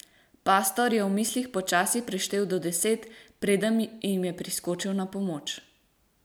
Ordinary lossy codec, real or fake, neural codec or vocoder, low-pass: none; real; none; none